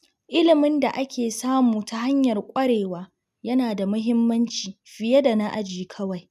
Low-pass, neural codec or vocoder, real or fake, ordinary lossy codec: 14.4 kHz; none; real; none